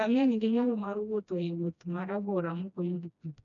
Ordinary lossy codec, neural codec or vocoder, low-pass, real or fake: none; codec, 16 kHz, 1 kbps, FreqCodec, smaller model; 7.2 kHz; fake